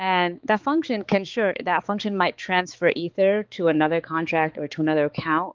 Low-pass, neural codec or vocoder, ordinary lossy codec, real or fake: 7.2 kHz; codec, 16 kHz, 4 kbps, X-Codec, HuBERT features, trained on balanced general audio; Opus, 32 kbps; fake